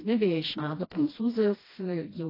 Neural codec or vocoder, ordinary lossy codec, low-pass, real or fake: codec, 16 kHz, 1 kbps, FreqCodec, smaller model; AAC, 24 kbps; 5.4 kHz; fake